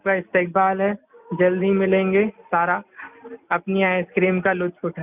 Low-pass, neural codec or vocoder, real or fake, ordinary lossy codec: 3.6 kHz; none; real; none